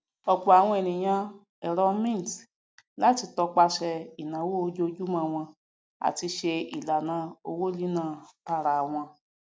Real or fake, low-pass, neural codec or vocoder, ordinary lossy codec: real; none; none; none